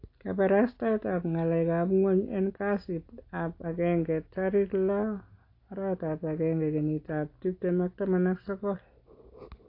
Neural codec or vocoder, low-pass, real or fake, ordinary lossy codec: none; 5.4 kHz; real; AAC, 32 kbps